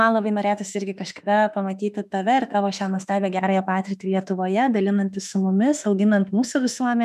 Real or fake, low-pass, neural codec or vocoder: fake; 14.4 kHz; autoencoder, 48 kHz, 32 numbers a frame, DAC-VAE, trained on Japanese speech